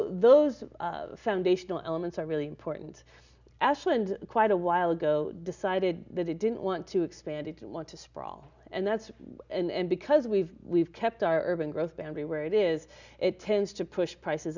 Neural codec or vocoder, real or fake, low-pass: none; real; 7.2 kHz